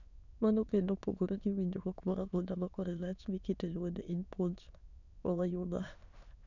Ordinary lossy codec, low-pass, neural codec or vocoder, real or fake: none; 7.2 kHz; autoencoder, 22.05 kHz, a latent of 192 numbers a frame, VITS, trained on many speakers; fake